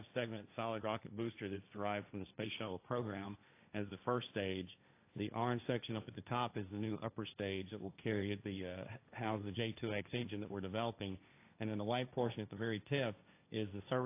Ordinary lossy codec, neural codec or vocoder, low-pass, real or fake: AAC, 24 kbps; codec, 16 kHz, 1.1 kbps, Voila-Tokenizer; 3.6 kHz; fake